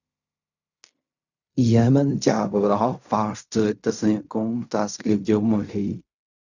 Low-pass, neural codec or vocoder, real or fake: 7.2 kHz; codec, 16 kHz in and 24 kHz out, 0.4 kbps, LongCat-Audio-Codec, fine tuned four codebook decoder; fake